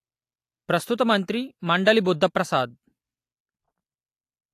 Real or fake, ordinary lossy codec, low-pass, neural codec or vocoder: real; AAC, 64 kbps; 14.4 kHz; none